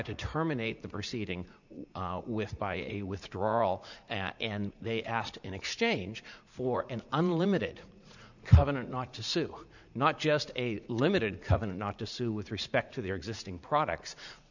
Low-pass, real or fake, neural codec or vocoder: 7.2 kHz; fake; vocoder, 44.1 kHz, 80 mel bands, Vocos